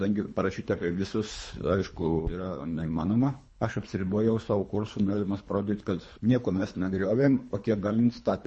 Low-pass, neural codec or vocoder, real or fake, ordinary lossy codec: 10.8 kHz; codec, 24 kHz, 3 kbps, HILCodec; fake; MP3, 32 kbps